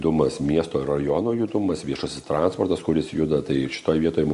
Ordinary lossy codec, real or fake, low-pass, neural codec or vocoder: MP3, 48 kbps; real; 14.4 kHz; none